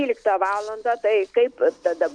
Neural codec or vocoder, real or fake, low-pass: none; real; 9.9 kHz